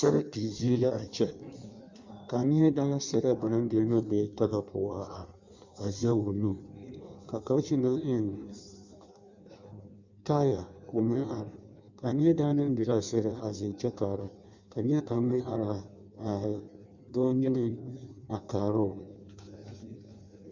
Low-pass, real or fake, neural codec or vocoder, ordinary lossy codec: 7.2 kHz; fake; codec, 16 kHz in and 24 kHz out, 1.1 kbps, FireRedTTS-2 codec; Opus, 64 kbps